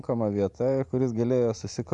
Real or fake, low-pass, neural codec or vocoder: fake; 10.8 kHz; vocoder, 44.1 kHz, 128 mel bands every 512 samples, BigVGAN v2